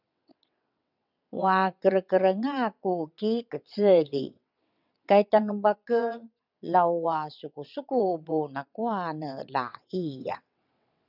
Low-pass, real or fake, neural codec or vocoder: 5.4 kHz; fake; vocoder, 22.05 kHz, 80 mel bands, WaveNeXt